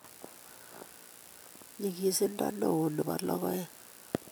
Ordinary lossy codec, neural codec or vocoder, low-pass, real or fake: none; none; none; real